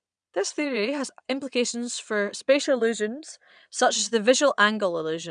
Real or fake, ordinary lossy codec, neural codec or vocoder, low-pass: fake; none; vocoder, 22.05 kHz, 80 mel bands, Vocos; 9.9 kHz